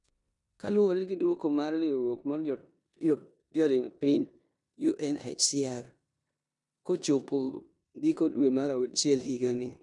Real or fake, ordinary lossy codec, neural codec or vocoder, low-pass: fake; none; codec, 16 kHz in and 24 kHz out, 0.9 kbps, LongCat-Audio-Codec, four codebook decoder; 10.8 kHz